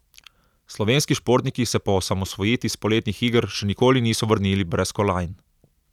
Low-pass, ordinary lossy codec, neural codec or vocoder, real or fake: 19.8 kHz; none; vocoder, 44.1 kHz, 128 mel bands every 256 samples, BigVGAN v2; fake